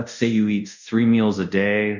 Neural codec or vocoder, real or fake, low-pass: codec, 24 kHz, 0.5 kbps, DualCodec; fake; 7.2 kHz